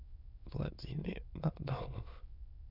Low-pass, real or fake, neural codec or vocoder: 5.4 kHz; fake; autoencoder, 22.05 kHz, a latent of 192 numbers a frame, VITS, trained on many speakers